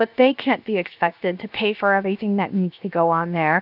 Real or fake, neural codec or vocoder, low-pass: fake; codec, 16 kHz, 0.7 kbps, FocalCodec; 5.4 kHz